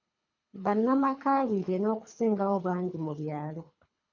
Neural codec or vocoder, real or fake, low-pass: codec, 24 kHz, 3 kbps, HILCodec; fake; 7.2 kHz